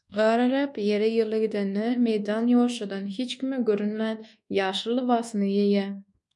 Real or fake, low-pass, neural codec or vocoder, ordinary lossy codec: fake; 10.8 kHz; codec, 24 kHz, 1.2 kbps, DualCodec; MP3, 96 kbps